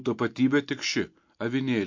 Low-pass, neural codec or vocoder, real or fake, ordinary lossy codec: 7.2 kHz; none; real; MP3, 48 kbps